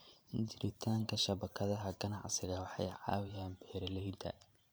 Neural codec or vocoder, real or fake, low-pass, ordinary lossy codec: vocoder, 44.1 kHz, 128 mel bands every 512 samples, BigVGAN v2; fake; none; none